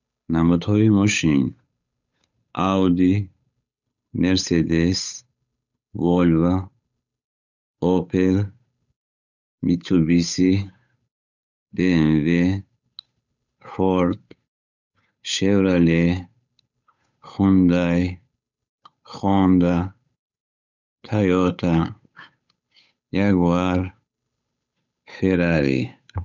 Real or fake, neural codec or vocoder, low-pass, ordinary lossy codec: fake; codec, 16 kHz, 8 kbps, FunCodec, trained on Chinese and English, 25 frames a second; 7.2 kHz; none